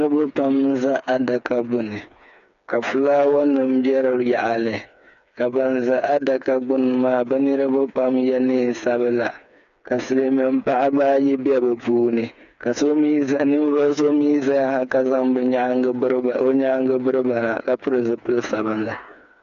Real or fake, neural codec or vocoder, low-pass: fake; codec, 16 kHz, 4 kbps, FreqCodec, smaller model; 7.2 kHz